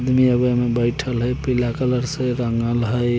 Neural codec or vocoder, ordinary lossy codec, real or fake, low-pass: none; none; real; none